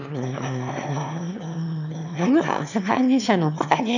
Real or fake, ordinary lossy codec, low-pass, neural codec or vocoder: fake; none; 7.2 kHz; autoencoder, 22.05 kHz, a latent of 192 numbers a frame, VITS, trained on one speaker